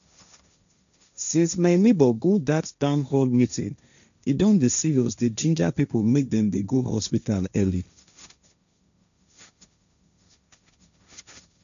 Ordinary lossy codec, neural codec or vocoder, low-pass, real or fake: none; codec, 16 kHz, 1.1 kbps, Voila-Tokenizer; 7.2 kHz; fake